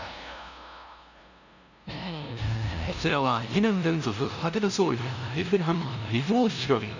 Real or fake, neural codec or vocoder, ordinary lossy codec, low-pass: fake; codec, 16 kHz, 0.5 kbps, FunCodec, trained on LibriTTS, 25 frames a second; none; 7.2 kHz